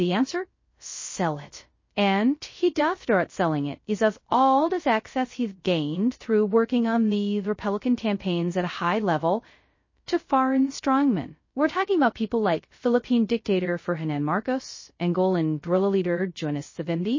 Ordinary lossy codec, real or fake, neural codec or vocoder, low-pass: MP3, 32 kbps; fake; codec, 16 kHz, 0.2 kbps, FocalCodec; 7.2 kHz